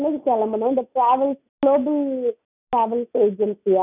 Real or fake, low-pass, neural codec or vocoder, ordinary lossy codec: real; 3.6 kHz; none; none